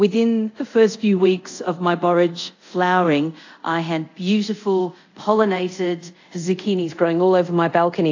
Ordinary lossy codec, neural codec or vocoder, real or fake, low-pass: AAC, 48 kbps; codec, 24 kHz, 0.5 kbps, DualCodec; fake; 7.2 kHz